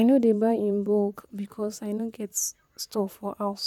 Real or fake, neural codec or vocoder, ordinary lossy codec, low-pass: fake; vocoder, 44.1 kHz, 128 mel bands, Pupu-Vocoder; none; 19.8 kHz